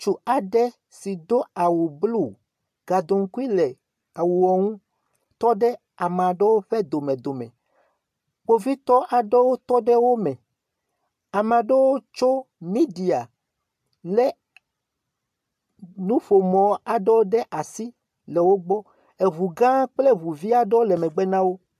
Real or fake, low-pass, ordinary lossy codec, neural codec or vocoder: real; 14.4 kHz; AAC, 96 kbps; none